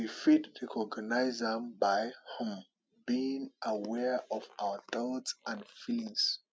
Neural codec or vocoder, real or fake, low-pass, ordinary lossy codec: none; real; none; none